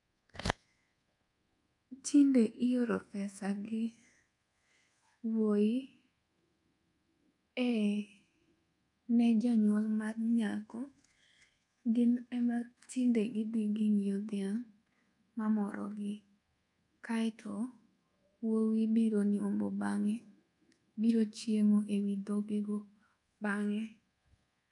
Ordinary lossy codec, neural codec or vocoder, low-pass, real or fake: none; codec, 24 kHz, 1.2 kbps, DualCodec; 10.8 kHz; fake